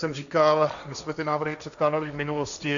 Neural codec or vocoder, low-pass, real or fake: codec, 16 kHz, 1.1 kbps, Voila-Tokenizer; 7.2 kHz; fake